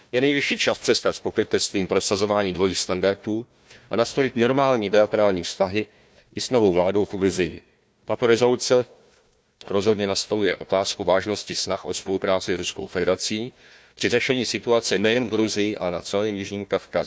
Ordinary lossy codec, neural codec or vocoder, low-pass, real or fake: none; codec, 16 kHz, 1 kbps, FunCodec, trained on Chinese and English, 50 frames a second; none; fake